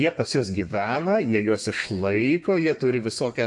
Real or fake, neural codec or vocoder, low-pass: fake; codec, 32 kHz, 1.9 kbps, SNAC; 10.8 kHz